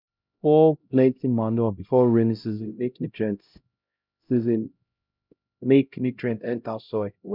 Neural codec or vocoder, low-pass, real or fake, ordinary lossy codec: codec, 16 kHz, 0.5 kbps, X-Codec, HuBERT features, trained on LibriSpeech; 5.4 kHz; fake; none